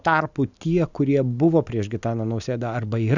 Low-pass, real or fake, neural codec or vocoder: 7.2 kHz; real; none